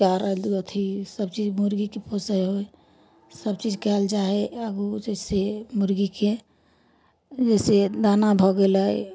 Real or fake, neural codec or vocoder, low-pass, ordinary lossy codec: real; none; none; none